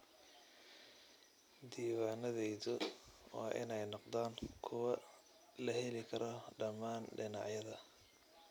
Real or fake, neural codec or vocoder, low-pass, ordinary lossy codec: real; none; none; none